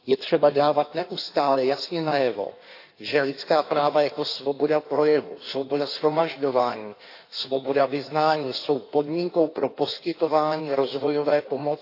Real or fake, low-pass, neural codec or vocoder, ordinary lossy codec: fake; 5.4 kHz; codec, 16 kHz in and 24 kHz out, 1.1 kbps, FireRedTTS-2 codec; AAC, 32 kbps